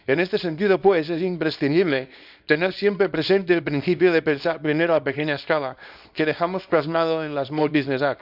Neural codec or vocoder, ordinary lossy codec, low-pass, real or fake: codec, 24 kHz, 0.9 kbps, WavTokenizer, small release; none; 5.4 kHz; fake